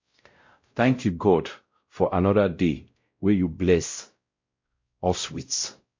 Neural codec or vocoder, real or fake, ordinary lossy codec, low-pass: codec, 16 kHz, 0.5 kbps, X-Codec, WavLM features, trained on Multilingual LibriSpeech; fake; MP3, 48 kbps; 7.2 kHz